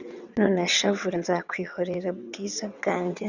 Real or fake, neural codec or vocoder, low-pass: fake; vocoder, 22.05 kHz, 80 mel bands, WaveNeXt; 7.2 kHz